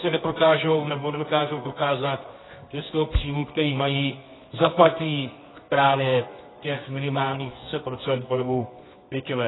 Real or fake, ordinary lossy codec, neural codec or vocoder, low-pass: fake; AAC, 16 kbps; codec, 24 kHz, 0.9 kbps, WavTokenizer, medium music audio release; 7.2 kHz